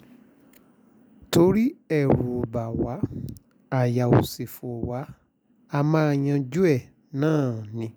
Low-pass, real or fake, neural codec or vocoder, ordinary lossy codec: none; real; none; none